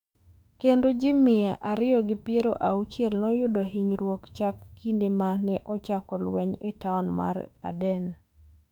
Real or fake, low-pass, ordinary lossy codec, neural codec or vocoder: fake; 19.8 kHz; none; autoencoder, 48 kHz, 32 numbers a frame, DAC-VAE, trained on Japanese speech